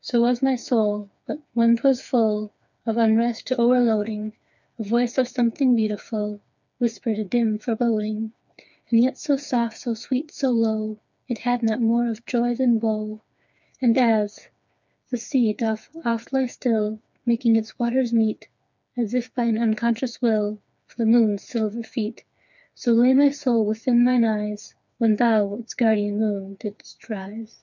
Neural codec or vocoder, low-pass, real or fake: codec, 16 kHz, 4 kbps, FreqCodec, smaller model; 7.2 kHz; fake